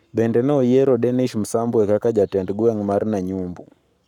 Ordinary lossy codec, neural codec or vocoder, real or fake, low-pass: none; codec, 44.1 kHz, 7.8 kbps, Pupu-Codec; fake; 19.8 kHz